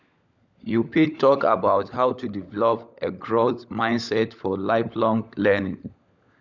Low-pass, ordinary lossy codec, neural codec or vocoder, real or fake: 7.2 kHz; none; codec, 16 kHz, 16 kbps, FunCodec, trained on LibriTTS, 50 frames a second; fake